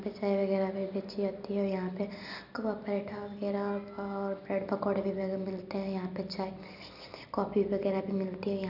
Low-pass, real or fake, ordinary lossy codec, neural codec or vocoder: 5.4 kHz; real; none; none